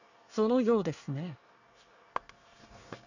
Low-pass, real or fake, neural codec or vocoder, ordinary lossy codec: 7.2 kHz; fake; codec, 24 kHz, 1 kbps, SNAC; none